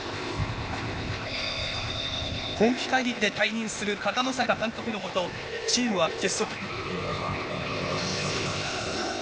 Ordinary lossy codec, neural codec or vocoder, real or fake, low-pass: none; codec, 16 kHz, 0.8 kbps, ZipCodec; fake; none